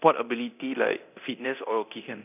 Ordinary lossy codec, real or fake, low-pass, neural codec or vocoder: none; fake; 3.6 kHz; codec, 24 kHz, 0.9 kbps, DualCodec